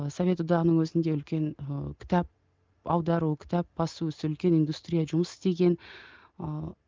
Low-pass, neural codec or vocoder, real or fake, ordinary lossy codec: 7.2 kHz; none; real; Opus, 32 kbps